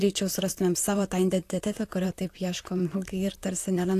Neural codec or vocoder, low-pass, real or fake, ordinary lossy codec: vocoder, 44.1 kHz, 128 mel bands, Pupu-Vocoder; 14.4 kHz; fake; AAC, 64 kbps